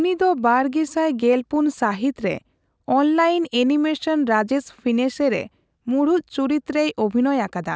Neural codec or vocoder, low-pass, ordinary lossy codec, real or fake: none; none; none; real